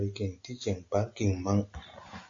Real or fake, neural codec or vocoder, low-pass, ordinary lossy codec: real; none; 7.2 kHz; AAC, 48 kbps